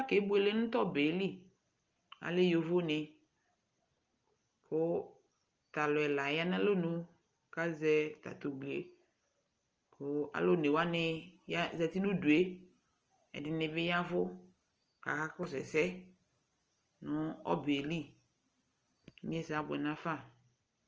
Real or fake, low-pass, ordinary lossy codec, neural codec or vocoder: real; 7.2 kHz; Opus, 24 kbps; none